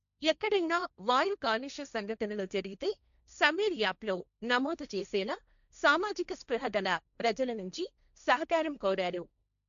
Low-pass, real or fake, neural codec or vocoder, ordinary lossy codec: 7.2 kHz; fake; codec, 16 kHz, 1.1 kbps, Voila-Tokenizer; none